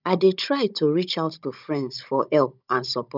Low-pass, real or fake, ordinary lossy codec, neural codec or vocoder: 5.4 kHz; fake; none; codec, 16 kHz, 16 kbps, FunCodec, trained on Chinese and English, 50 frames a second